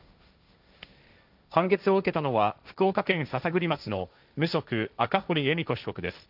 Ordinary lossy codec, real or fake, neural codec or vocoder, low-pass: none; fake; codec, 16 kHz, 1.1 kbps, Voila-Tokenizer; 5.4 kHz